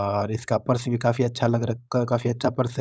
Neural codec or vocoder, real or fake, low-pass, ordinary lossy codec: codec, 16 kHz, 4.8 kbps, FACodec; fake; none; none